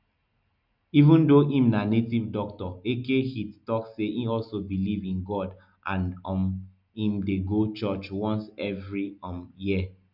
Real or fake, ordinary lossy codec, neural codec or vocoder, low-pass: real; none; none; 5.4 kHz